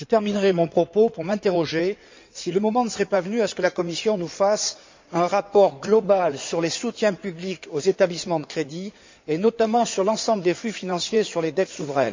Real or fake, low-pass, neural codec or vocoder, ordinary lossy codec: fake; 7.2 kHz; codec, 16 kHz in and 24 kHz out, 2.2 kbps, FireRedTTS-2 codec; MP3, 64 kbps